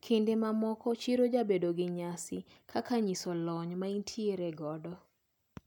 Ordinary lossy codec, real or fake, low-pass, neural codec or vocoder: none; real; 19.8 kHz; none